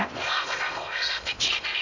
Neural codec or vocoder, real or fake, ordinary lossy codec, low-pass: codec, 16 kHz in and 24 kHz out, 0.8 kbps, FocalCodec, streaming, 65536 codes; fake; none; 7.2 kHz